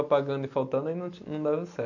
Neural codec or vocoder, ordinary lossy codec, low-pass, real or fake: none; none; 7.2 kHz; real